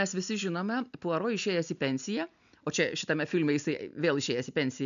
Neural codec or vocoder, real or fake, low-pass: none; real; 7.2 kHz